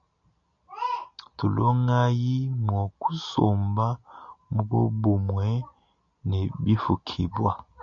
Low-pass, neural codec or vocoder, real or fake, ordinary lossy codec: 7.2 kHz; none; real; MP3, 96 kbps